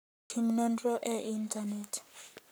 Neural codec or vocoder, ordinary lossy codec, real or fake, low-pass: codec, 44.1 kHz, 7.8 kbps, Pupu-Codec; none; fake; none